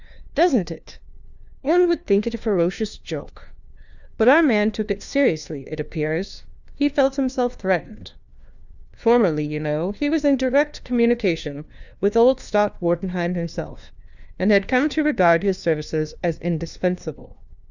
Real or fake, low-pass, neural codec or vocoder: fake; 7.2 kHz; codec, 16 kHz, 1 kbps, FunCodec, trained on LibriTTS, 50 frames a second